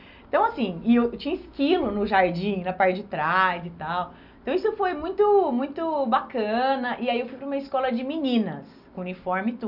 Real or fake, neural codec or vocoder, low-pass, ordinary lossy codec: real; none; 5.4 kHz; none